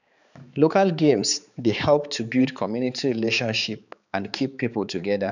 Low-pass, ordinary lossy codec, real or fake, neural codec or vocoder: 7.2 kHz; none; fake; codec, 16 kHz, 4 kbps, X-Codec, HuBERT features, trained on balanced general audio